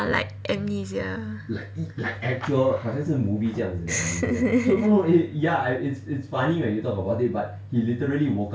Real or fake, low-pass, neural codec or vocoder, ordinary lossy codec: real; none; none; none